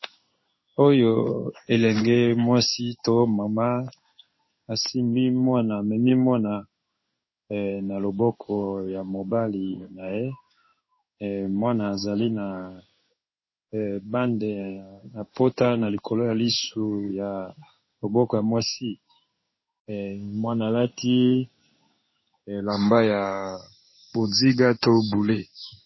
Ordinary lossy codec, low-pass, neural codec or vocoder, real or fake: MP3, 24 kbps; 7.2 kHz; codec, 16 kHz in and 24 kHz out, 1 kbps, XY-Tokenizer; fake